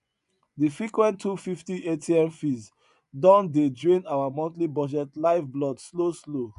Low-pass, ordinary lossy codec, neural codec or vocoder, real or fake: 10.8 kHz; none; vocoder, 24 kHz, 100 mel bands, Vocos; fake